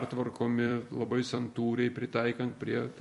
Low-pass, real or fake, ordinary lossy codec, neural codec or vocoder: 14.4 kHz; fake; MP3, 48 kbps; vocoder, 44.1 kHz, 128 mel bands every 256 samples, BigVGAN v2